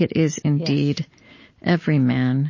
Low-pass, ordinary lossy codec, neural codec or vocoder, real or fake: 7.2 kHz; MP3, 32 kbps; none; real